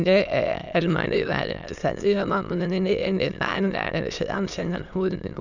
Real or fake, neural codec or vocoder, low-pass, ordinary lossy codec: fake; autoencoder, 22.05 kHz, a latent of 192 numbers a frame, VITS, trained on many speakers; 7.2 kHz; none